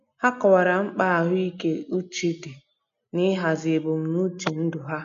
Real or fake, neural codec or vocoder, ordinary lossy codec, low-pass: real; none; none; 7.2 kHz